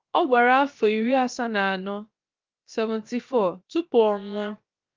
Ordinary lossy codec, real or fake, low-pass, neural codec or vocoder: Opus, 24 kbps; fake; 7.2 kHz; codec, 16 kHz, 0.7 kbps, FocalCodec